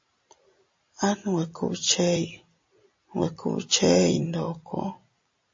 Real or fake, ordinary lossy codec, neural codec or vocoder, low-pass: real; MP3, 32 kbps; none; 7.2 kHz